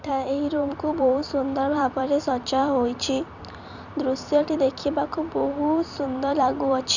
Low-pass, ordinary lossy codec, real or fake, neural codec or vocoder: 7.2 kHz; none; fake; vocoder, 44.1 kHz, 128 mel bands every 256 samples, BigVGAN v2